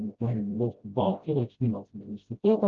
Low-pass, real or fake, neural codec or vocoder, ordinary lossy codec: 7.2 kHz; fake; codec, 16 kHz, 0.5 kbps, FreqCodec, smaller model; Opus, 24 kbps